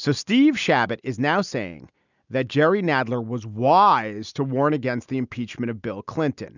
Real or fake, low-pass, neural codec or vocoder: real; 7.2 kHz; none